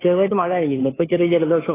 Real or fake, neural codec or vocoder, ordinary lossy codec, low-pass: fake; codec, 16 kHz, 2 kbps, FunCodec, trained on Chinese and English, 25 frames a second; AAC, 16 kbps; 3.6 kHz